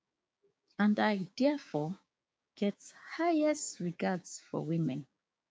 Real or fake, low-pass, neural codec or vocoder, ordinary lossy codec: fake; none; codec, 16 kHz, 6 kbps, DAC; none